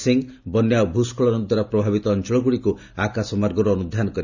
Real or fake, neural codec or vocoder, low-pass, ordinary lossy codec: real; none; 7.2 kHz; none